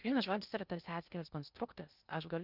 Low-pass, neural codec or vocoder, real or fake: 5.4 kHz; codec, 16 kHz in and 24 kHz out, 0.6 kbps, FocalCodec, streaming, 2048 codes; fake